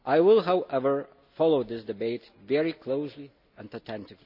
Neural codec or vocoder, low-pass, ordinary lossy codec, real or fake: none; 5.4 kHz; none; real